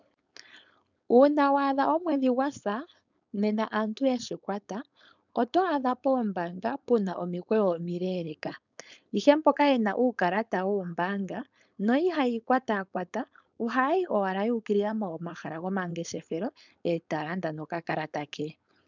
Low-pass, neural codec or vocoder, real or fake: 7.2 kHz; codec, 16 kHz, 4.8 kbps, FACodec; fake